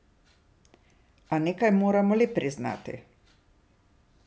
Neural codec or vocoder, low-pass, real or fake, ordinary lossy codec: none; none; real; none